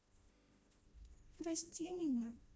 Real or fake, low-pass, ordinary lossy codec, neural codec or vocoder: fake; none; none; codec, 16 kHz, 1 kbps, FreqCodec, smaller model